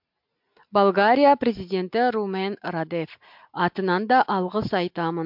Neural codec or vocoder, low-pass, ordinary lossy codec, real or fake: none; 5.4 kHz; MP3, 48 kbps; real